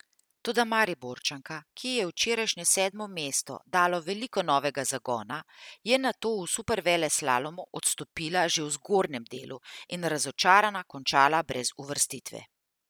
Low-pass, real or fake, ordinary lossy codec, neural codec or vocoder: none; real; none; none